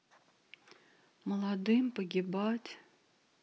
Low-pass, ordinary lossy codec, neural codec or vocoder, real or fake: none; none; none; real